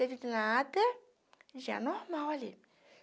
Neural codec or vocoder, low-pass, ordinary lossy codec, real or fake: none; none; none; real